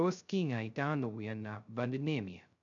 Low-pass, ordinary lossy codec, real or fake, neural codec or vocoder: 7.2 kHz; none; fake; codec, 16 kHz, 0.2 kbps, FocalCodec